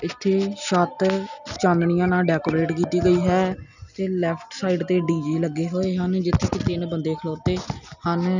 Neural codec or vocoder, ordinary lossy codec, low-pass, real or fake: none; none; 7.2 kHz; real